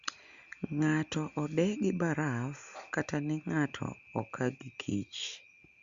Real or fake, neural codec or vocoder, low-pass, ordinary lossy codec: real; none; 7.2 kHz; Opus, 64 kbps